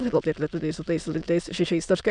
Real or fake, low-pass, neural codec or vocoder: fake; 9.9 kHz; autoencoder, 22.05 kHz, a latent of 192 numbers a frame, VITS, trained on many speakers